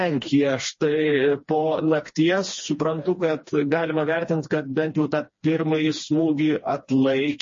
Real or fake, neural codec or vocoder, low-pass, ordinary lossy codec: fake; codec, 16 kHz, 2 kbps, FreqCodec, smaller model; 7.2 kHz; MP3, 32 kbps